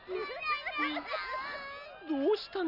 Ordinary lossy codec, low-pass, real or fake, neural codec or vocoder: none; 5.4 kHz; real; none